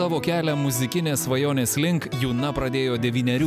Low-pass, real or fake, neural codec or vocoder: 14.4 kHz; real; none